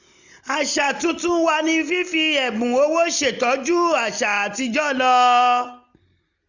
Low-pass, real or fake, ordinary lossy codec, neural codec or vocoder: 7.2 kHz; real; none; none